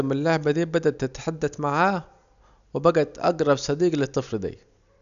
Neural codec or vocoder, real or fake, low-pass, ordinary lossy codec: none; real; 7.2 kHz; none